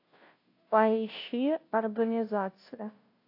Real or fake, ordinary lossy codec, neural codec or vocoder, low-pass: fake; AAC, 32 kbps; codec, 16 kHz, 0.5 kbps, FunCodec, trained on Chinese and English, 25 frames a second; 5.4 kHz